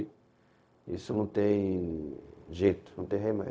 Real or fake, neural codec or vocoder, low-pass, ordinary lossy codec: fake; codec, 16 kHz, 0.4 kbps, LongCat-Audio-Codec; none; none